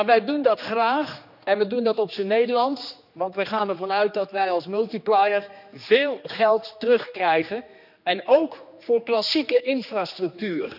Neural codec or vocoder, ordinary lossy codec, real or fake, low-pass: codec, 16 kHz, 2 kbps, X-Codec, HuBERT features, trained on general audio; none; fake; 5.4 kHz